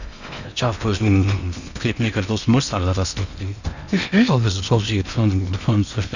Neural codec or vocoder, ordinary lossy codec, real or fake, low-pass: codec, 16 kHz in and 24 kHz out, 0.8 kbps, FocalCodec, streaming, 65536 codes; none; fake; 7.2 kHz